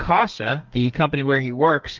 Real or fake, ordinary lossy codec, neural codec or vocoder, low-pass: fake; Opus, 32 kbps; codec, 32 kHz, 1.9 kbps, SNAC; 7.2 kHz